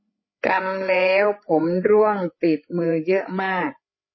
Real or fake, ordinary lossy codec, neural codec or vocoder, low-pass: fake; MP3, 24 kbps; codec, 16 kHz, 8 kbps, FreqCodec, larger model; 7.2 kHz